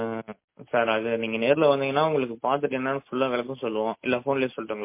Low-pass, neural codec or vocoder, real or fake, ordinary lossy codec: 3.6 kHz; none; real; MP3, 24 kbps